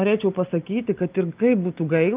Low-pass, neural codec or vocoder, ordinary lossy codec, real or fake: 3.6 kHz; none; Opus, 24 kbps; real